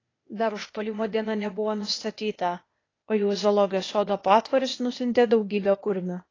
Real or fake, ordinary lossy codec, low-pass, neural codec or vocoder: fake; AAC, 32 kbps; 7.2 kHz; codec, 16 kHz, 0.8 kbps, ZipCodec